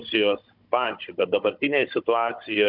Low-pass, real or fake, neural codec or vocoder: 5.4 kHz; fake; codec, 16 kHz, 16 kbps, FunCodec, trained on Chinese and English, 50 frames a second